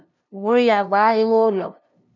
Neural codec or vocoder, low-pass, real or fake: codec, 16 kHz, 0.5 kbps, FunCodec, trained on LibriTTS, 25 frames a second; 7.2 kHz; fake